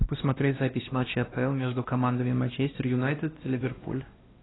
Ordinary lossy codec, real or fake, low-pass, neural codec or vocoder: AAC, 16 kbps; fake; 7.2 kHz; codec, 16 kHz, 1 kbps, X-Codec, WavLM features, trained on Multilingual LibriSpeech